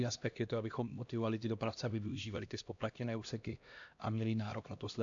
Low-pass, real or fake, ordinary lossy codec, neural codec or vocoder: 7.2 kHz; fake; AAC, 64 kbps; codec, 16 kHz, 1 kbps, X-Codec, HuBERT features, trained on LibriSpeech